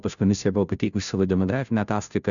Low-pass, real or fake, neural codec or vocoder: 7.2 kHz; fake; codec, 16 kHz, 0.5 kbps, FunCodec, trained on Chinese and English, 25 frames a second